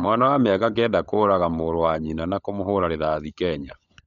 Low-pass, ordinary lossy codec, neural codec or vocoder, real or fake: 7.2 kHz; none; codec, 16 kHz, 16 kbps, FunCodec, trained on LibriTTS, 50 frames a second; fake